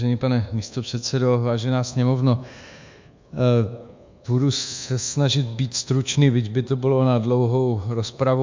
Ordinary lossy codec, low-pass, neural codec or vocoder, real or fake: MP3, 64 kbps; 7.2 kHz; codec, 24 kHz, 1.2 kbps, DualCodec; fake